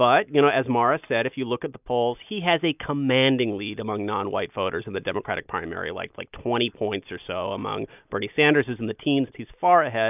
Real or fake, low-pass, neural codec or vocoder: real; 3.6 kHz; none